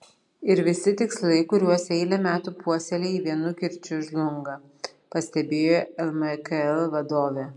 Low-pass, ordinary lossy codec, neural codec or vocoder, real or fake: 10.8 kHz; MP3, 64 kbps; none; real